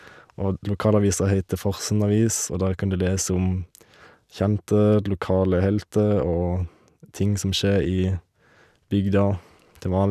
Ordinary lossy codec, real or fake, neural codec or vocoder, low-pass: none; real; none; 14.4 kHz